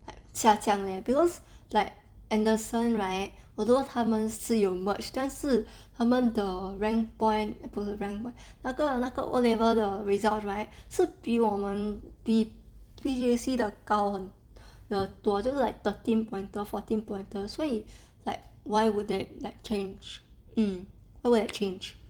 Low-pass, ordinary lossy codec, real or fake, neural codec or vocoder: 19.8 kHz; Opus, 32 kbps; fake; vocoder, 44.1 kHz, 128 mel bands every 256 samples, BigVGAN v2